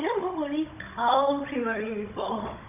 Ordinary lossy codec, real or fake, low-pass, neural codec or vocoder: none; fake; 3.6 kHz; codec, 16 kHz, 16 kbps, FunCodec, trained on Chinese and English, 50 frames a second